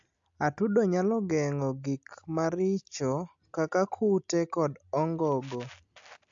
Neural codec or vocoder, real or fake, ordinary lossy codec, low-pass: none; real; AAC, 64 kbps; 7.2 kHz